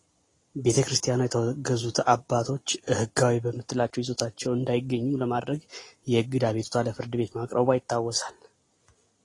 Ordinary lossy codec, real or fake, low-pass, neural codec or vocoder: AAC, 32 kbps; real; 10.8 kHz; none